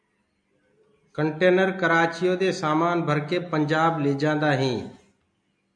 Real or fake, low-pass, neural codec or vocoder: real; 9.9 kHz; none